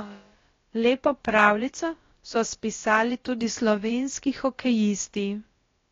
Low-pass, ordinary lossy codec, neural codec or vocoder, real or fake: 7.2 kHz; AAC, 32 kbps; codec, 16 kHz, about 1 kbps, DyCAST, with the encoder's durations; fake